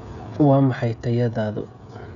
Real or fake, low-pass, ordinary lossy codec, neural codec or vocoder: fake; 7.2 kHz; none; codec, 16 kHz, 16 kbps, FreqCodec, smaller model